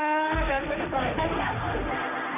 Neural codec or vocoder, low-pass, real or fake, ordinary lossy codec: codec, 16 kHz, 1.1 kbps, Voila-Tokenizer; 3.6 kHz; fake; none